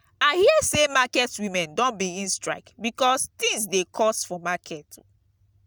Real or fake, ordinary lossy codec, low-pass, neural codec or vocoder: real; none; none; none